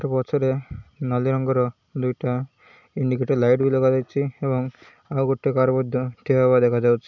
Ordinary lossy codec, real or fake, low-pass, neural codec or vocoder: none; real; 7.2 kHz; none